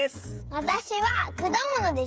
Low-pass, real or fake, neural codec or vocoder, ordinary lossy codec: none; fake; codec, 16 kHz, 8 kbps, FreqCodec, smaller model; none